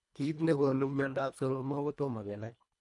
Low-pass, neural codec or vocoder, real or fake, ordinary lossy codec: 10.8 kHz; codec, 24 kHz, 1.5 kbps, HILCodec; fake; none